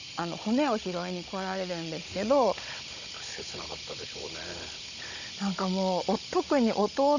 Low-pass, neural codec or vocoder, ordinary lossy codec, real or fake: 7.2 kHz; codec, 16 kHz, 16 kbps, FunCodec, trained on Chinese and English, 50 frames a second; none; fake